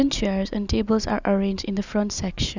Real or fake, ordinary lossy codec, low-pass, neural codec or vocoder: real; none; 7.2 kHz; none